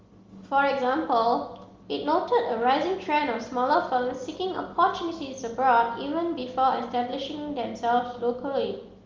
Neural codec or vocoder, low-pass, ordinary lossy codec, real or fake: none; 7.2 kHz; Opus, 32 kbps; real